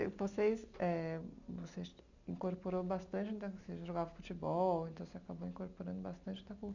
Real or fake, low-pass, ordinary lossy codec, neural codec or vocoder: real; 7.2 kHz; none; none